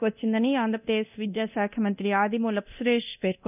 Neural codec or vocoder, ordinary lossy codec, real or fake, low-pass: codec, 24 kHz, 0.9 kbps, DualCodec; none; fake; 3.6 kHz